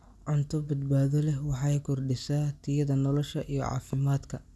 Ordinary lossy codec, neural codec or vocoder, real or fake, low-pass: none; none; real; none